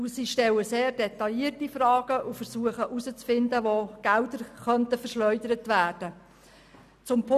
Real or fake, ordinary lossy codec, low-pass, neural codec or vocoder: real; none; 14.4 kHz; none